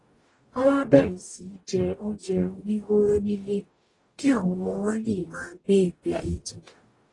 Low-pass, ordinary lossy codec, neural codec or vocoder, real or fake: 10.8 kHz; AAC, 32 kbps; codec, 44.1 kHz, 0.9 kbps, DAC; fake